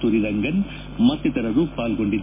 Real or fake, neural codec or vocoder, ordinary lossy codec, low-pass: real; none; MP3, 16 kbps; 3.6 kHz